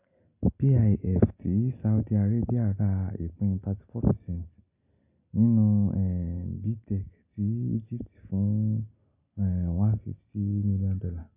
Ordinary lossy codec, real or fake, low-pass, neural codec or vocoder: none; real; 3.6 kHz; none